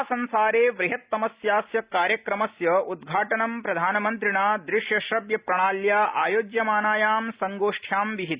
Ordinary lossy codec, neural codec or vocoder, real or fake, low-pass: Opus, 64 kbps; none; real; 3.6 kHz